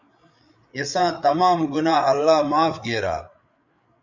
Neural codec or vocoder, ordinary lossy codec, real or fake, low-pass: codec, 16 kHz, 8 kbps, FreqCodec, larger model; Opus, 64 kbps; fake; 7.2 kHz